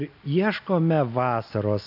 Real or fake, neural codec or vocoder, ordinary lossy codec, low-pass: real; none; AAC, 48 kbps; 5.4 kHz